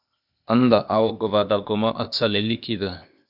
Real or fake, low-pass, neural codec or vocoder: fake; 5.4 kHz; codec, 16 kHz, 0.8 kbps, ZipCodec